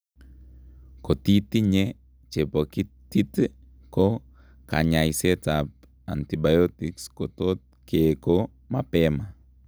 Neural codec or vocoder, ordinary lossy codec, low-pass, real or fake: none; none; none; real